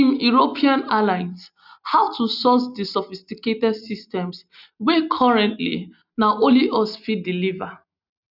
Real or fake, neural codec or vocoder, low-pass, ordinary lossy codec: real; none; 5.4 kHz; none